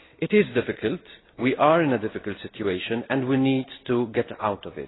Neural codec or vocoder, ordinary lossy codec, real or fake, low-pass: none; AAC, 16 kbps; real; 7.2 kHz